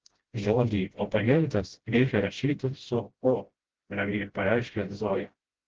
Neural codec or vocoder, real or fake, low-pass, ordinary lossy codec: codec, 16 kHz, 0.5 kbps, FreqCodec, smaller model; fake; 7.2 kHz; Opus, 16 kbps